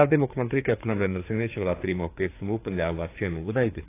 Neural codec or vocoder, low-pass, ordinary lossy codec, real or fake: codec, 16 kHz, 4 kbps, FreqCodec, larger model; 3.6 kHz; AAC, 24 kbps; fake